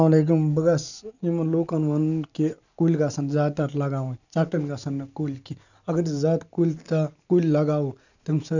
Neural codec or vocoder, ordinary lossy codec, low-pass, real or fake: none; none; 7.2 kHz; real